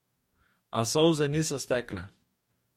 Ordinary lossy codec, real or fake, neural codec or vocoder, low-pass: MP3, 64 kbps; fake; codec, 44.1 kHz, 2.6 kbps, DAC; 19.8 kHz